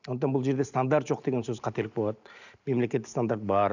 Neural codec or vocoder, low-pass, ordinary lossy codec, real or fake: none; 7.2 kHz; none; real